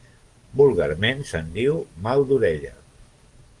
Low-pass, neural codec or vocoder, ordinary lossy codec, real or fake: 10.8 kHz; codec, 44.1 kHz, 7.8 kbps, DAC; Opus, 24 kbps; fake